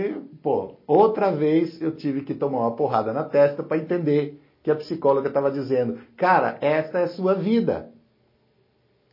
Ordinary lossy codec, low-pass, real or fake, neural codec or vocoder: MP3, 24 kbps; 5.4 kHz; real; none